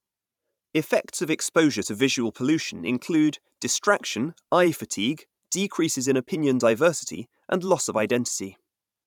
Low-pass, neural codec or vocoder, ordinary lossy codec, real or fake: 19.8 kHz; vocoder, 44.1 kHz, 128 mel bands every 512 samples, BigVGAN v2; none; fake